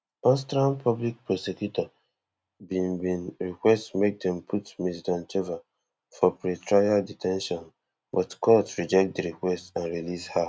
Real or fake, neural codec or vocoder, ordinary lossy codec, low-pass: real; none; none; none